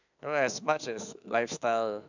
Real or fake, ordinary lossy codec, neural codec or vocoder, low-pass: fake; none; autoencoder, 48 kHz, 32 numbers a frame, DAC-VAE, trained on Japanese speech; 7.2 kHz